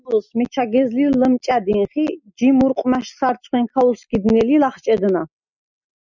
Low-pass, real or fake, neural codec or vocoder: 7.2 kHz; real; none